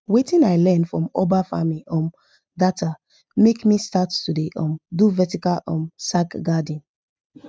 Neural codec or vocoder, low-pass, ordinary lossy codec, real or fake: none; none; none; real